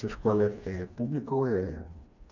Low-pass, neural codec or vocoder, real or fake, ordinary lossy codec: 7.2 kHz; codec, 16 kHz, 2 kbps, FreqCodec, smaller model; fake; none